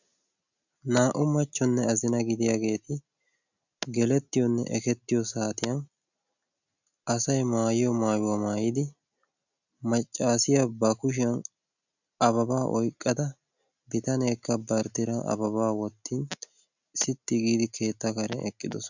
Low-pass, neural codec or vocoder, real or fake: 7.2 kHz; none; real